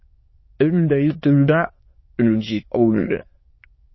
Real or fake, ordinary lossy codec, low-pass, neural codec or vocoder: fake; MP3, 24 kbps; 7.2 kHz; autoencoder, 22.05 kHz, a latent of 192 numbers a frame, VITS, trained on many speakers